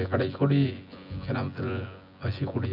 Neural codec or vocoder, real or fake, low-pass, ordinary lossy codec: vocoder, 24 kHz, 100 mel bands, Vocos; fake; 5.4 kHz; none